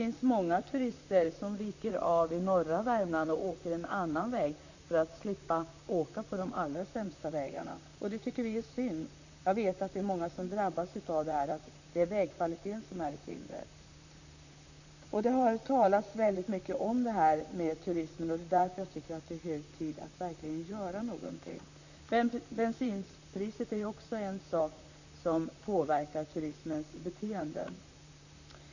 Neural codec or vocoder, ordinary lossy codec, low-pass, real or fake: vocoder, 44.1 kHz, 128 mel bands, Pupu-Vocoder; none; 7.2 kHz; fake